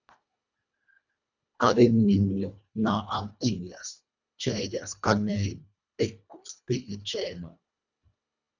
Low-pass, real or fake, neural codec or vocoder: 7.2 kHz; fake; codec, 24 kHz, 1.5 kbps, HILCodec